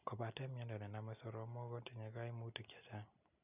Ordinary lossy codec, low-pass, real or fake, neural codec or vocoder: none; 3.6 kHz; real; none